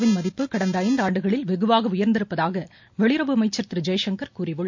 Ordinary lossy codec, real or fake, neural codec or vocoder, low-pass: MP3, 48 kbps; real; none; 7.2 kHz